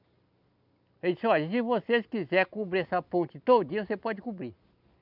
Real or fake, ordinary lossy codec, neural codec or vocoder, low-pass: real; none; none; 5.4 kHz